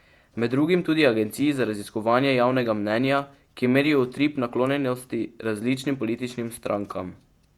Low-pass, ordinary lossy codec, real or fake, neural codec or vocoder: 19.8 kHz; Opus, 64 kbps; fake; vocoder, 48 kHz, 128 mel bands, Vocos